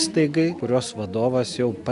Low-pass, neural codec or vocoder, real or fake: 10.8 kHz; none; real